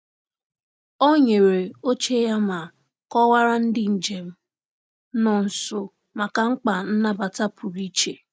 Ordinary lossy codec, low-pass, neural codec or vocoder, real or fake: none; none; none; real